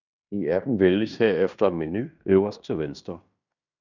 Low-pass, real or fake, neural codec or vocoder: 7.2 kHz; fake; codec, 16 kHz in and 24 kHz out, 0.9 kbps, LongCat-Audio-Codec, fine tuned four codebook decoder